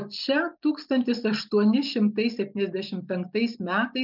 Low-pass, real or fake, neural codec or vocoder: 5.4 kHz; real; none